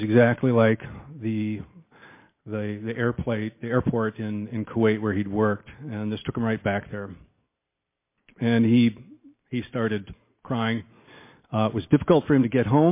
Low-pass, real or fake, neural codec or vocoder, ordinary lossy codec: 3.6 kHz; real; none; MP3, 24 kbps